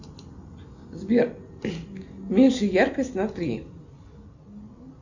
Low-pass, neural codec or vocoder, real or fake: 7.2 kHz; none; real